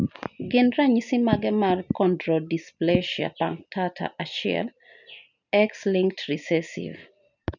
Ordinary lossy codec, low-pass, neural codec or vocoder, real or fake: none; 7.2 kHz; none; real